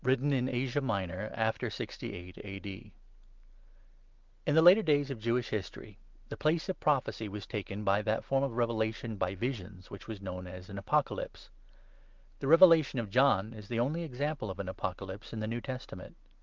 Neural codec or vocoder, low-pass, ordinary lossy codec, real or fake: none; 7.2 kHz; Opus, 24 kbps; real